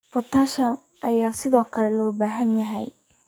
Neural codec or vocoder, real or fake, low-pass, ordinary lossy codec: codec, 44.1 kHz, 2.6 kbps, SNAC; fake; none; none